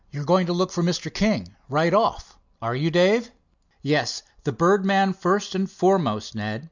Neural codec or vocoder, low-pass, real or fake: none; 7.2 kHz; real